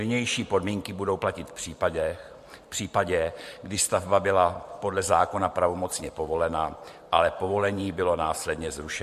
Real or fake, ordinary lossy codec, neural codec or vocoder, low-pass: fake; MP3, 64 kbps; vocoder, 44.1 kHz, 128 mel bands every 512 samples, BigVGAN v2; 14.4 kHz